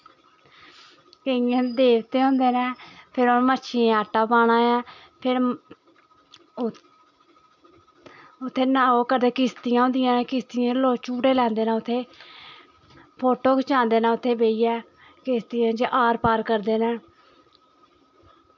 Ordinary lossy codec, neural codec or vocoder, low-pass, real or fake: MP3, 64 kbps; none; 7.2 kHz; real